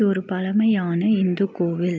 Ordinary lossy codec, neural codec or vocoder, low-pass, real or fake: none; none; none; real